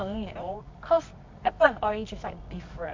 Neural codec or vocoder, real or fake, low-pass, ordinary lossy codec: codec, 24 kHz, 0.9 kbps, WavTokenizer, medium music audio release; fake; 7.2 kHz; MP3, 48 kbps